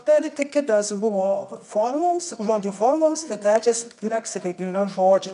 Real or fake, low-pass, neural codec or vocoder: fake; 10.8 kHz; codec, 24 kHz, 0.9 kbps, WavTokenizer, medium music audio release